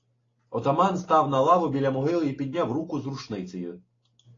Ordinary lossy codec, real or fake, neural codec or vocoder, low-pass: AAC, 32 kbps; real; none; 7.2 kHz